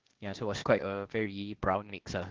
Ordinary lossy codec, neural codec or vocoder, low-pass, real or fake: Opus, 16 kbps; codec, 16 kHz, 0.8 kbps, ZipCodec; 7.2 kHz; fake